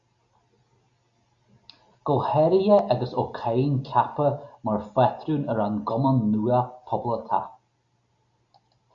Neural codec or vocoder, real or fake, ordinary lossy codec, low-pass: none; real; Opus, 64 kbps; 7.2 kHz